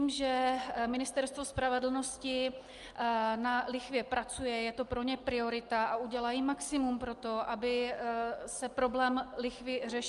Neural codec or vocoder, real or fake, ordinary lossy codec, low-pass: none; real; Opus, 32 kbps; 10.8 kHz